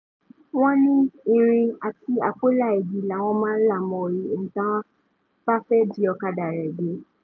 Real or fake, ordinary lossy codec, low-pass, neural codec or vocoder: real; none; 7.2 kHz; none